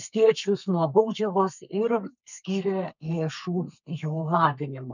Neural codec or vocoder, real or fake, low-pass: codec, 32 kHz, 1.9 kbps, SNAC; fake; 7.2 kHz